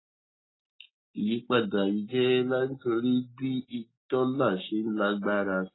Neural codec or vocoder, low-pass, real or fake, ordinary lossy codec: none; 7.2 kHz; real; AAC, 16 kbps